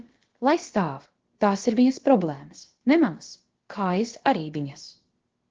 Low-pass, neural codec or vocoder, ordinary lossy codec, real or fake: 7.2 kHz; codec, 16 kHz, about 1 kbps, DyCAST, with the encoder's durations; Opus, 16 kbps; fake